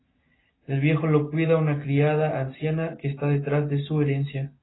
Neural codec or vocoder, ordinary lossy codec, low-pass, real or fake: none; AAC, 16 kbps; 7.2 kHz; real